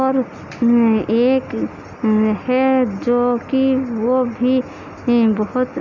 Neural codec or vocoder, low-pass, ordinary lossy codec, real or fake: none; 7.2 kHz; none; real